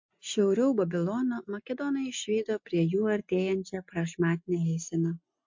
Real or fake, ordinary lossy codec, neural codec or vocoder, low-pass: real; MP3, 48 kbps; none; 7.2 kHz